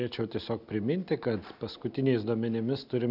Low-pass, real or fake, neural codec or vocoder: 5.4 kHz; real; none